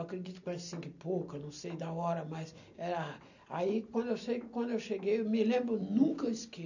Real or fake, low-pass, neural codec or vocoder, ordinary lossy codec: real; 7.2 kHz; none; none